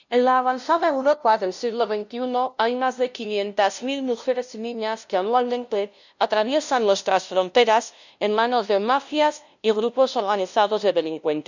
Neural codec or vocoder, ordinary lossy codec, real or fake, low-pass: codec, 16 kHz, 0.5 kbps, FunCodec, trained on LibriTTS, 25 frames a second; none; fake; 7.2 kHz